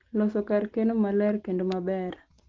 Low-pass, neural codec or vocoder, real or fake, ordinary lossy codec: 7.2 kHz; none; real; Opus, 16 kbps